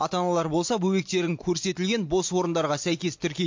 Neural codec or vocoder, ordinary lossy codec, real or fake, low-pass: none; MP3, 48 kbps; real; 7.2 kHz